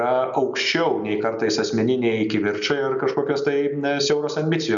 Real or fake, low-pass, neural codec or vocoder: real; 7.2 kHz; none